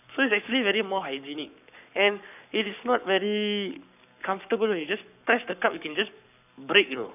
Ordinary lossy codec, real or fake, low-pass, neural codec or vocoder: none; fake; 3.6 kHz; codec, 44.1 kHz, 7.8 kbps, Pupu-Codec